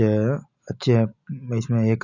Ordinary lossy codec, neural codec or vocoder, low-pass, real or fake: none; none; 7.2 kHz; real